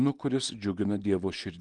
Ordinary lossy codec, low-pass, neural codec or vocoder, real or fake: Opus, 24 kbps; 10.8 kHz; none; real